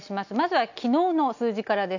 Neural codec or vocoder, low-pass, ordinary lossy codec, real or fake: none; 7.2 kHz; none; real